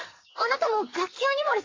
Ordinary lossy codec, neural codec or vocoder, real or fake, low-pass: AAC, 32 kbps; codec, 44.1 kHz, 2.6 kbps, SNAC; fake; 7.2 kHz